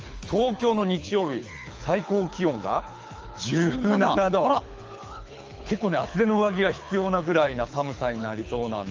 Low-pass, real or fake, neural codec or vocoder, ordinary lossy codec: 7.2 kHz; fake; codec, 24 kHz, 6 kbps, HILCodec; Opus, 24 kbps